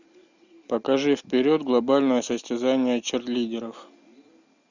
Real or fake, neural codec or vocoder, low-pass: real; none; 7.2 kHz